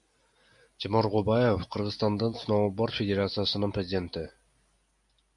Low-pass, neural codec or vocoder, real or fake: 10.8 kHz; none; real